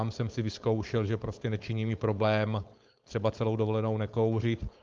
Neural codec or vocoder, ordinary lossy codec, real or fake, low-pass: codec, 16 kHz, 4.8 kbps, FACodec; Opus, 32 kbps; fake; 7.2 kHz